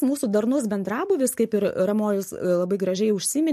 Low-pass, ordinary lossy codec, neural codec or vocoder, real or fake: 14.4 kHz; MP3, 64 kbps; none; real